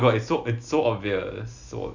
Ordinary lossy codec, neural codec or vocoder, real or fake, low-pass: none; none; real; 7.2 kHz